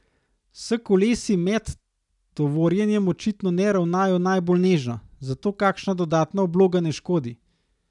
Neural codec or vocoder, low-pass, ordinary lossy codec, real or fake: none; 10.8 kHz; none; real